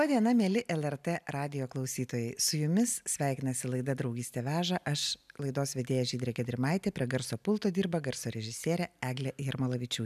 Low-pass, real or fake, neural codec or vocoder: 14.4 kHz; real; none